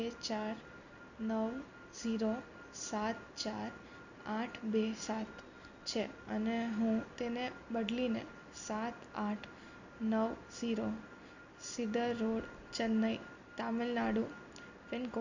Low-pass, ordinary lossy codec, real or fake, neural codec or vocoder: 7.2 kHz; none; real; none